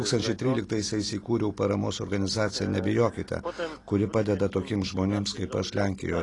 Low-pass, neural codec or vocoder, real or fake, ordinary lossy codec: 10.8 kHz; none; real; AAC, 32 kbps